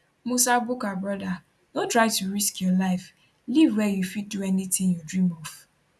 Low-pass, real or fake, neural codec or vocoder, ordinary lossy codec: none; real; none; none